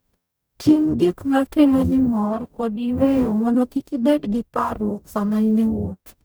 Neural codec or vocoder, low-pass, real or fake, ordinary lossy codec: codec, 44.1 kHz, 0.9 kbps, DAC; none; fake; none